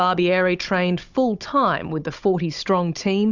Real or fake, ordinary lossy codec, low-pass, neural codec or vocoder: fake; Opus, 64 kbps; 7.2 kHz; codec, 16 kHz, 16 kbps, FunCodec, trained on Chinese and English, 50 frames a second